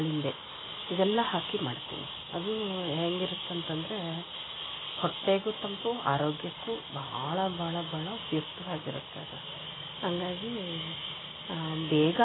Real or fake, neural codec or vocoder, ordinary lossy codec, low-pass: real; none; AAC, 16 kbps; 7.2 kHz